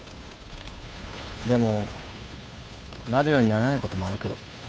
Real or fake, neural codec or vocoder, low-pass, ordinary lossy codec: fake; codec, 16 kHz, 2 kbps, FunCodec, trained on Chinese and English, 25 frames a second; none; none